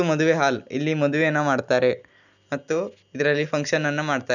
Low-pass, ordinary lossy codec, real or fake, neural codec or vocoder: 7.2 kHz; none; real; none